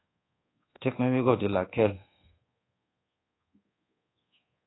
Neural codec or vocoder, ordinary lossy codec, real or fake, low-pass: codec, 24 kHz, 1.2 kbps, DualCodec; AAC, 16 kbps; fake; 7.2 kHz